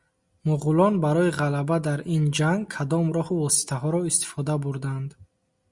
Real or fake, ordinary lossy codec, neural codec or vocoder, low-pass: real; Opus, 64 kbps; none; 10.8 kHz